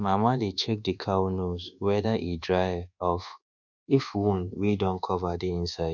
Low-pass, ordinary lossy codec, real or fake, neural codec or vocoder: 7.2 kHz; none; fake; codec, 24 kHz, 1.2 kbps, DualCodec